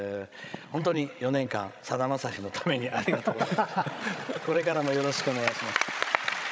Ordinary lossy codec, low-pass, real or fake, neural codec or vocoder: none; none; fake; codec, 16 kHz, 16 kbps, FreqCodec, larger model